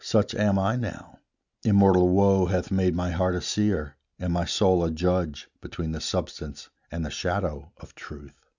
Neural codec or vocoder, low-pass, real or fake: none; 7.2 kHz; real